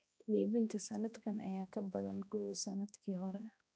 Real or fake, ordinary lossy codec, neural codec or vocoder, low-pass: fake; none; codec, 16 kHz, 1 kbps, X-Codec, HuBERT features, trained on balanced general audio; none